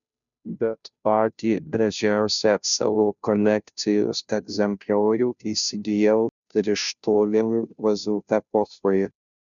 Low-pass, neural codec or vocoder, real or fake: 7.2 kHz; codec, 16 kHz, 0.5 kbps, FunCodec, trained on Chinese and English, 25 frames a second; fake